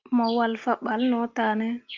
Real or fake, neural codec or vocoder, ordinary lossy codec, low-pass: real; none; Opus, 24 kbps; 7.2 kHz